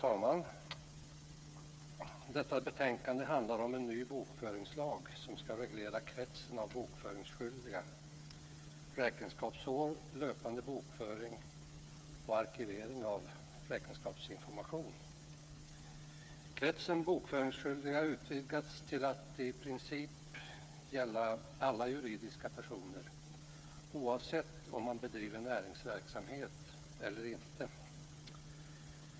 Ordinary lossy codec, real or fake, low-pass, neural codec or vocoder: none; fake; none; codec, 16 kHz, 8 kbps, FreqCodec, smaller model